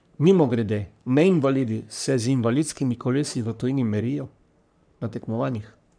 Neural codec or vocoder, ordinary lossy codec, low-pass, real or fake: codec, 44.1 kHz, 3.4 kbps, Pupu-Codec; none; 9.9 kHz; fake